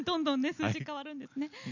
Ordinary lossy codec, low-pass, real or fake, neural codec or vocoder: none; 7.2 kHz; real; none